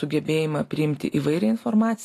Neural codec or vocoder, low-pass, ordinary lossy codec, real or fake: none; 14.4 kHz; AAC, 48 kbps; real